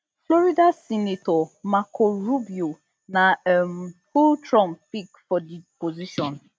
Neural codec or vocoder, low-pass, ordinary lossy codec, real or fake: none; none; none; real